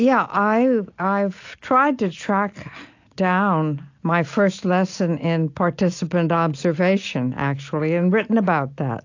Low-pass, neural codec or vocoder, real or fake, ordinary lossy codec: 7.2 kHz; none; real; AAC, 48 kbps